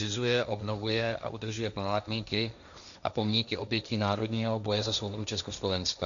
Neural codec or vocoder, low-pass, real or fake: codec, 16 kHz, 1.1 kbps, Voila-Tokenizer; 7.2 kHz; fake